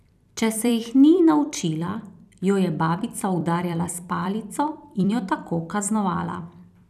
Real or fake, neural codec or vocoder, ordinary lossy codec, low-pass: fake; vocoder, 44.1 kHz, 128 mel bands every 256 samples, BigVGAN v2; none; 14.4 kHz